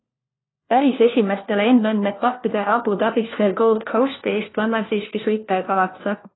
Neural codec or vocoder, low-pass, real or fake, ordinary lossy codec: codec, 16 kHz, 1 kbps, FunCodec, trained on LibriTTS, 50 frames a second; 7.2 kHz; fake; AAC, 16 kbps